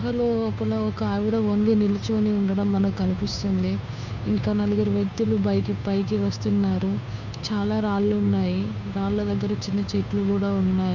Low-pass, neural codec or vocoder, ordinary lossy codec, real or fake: 7.2 kHz; codec, 16 kHz, 2 kbps, FunCodec, trained on Chinese and English, 25 frames a second; none; fake